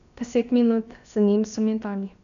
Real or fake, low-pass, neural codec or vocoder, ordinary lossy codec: fake; 7.2 kHz; codec, 16 kHz, about 1 kbps, DyCAST, with the encoder's durations; none